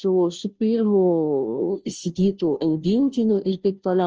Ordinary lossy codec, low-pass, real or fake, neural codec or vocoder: Opus, 24 kbps; 7.2 kHz; fake; codec, 16 kHz, 0.5 kbps, FunCodec, trained on Chinese and English, 25 frames a second